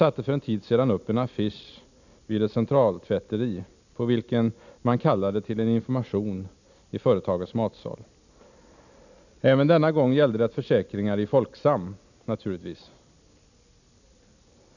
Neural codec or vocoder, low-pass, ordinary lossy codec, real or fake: none; 7.2 kHz; none; real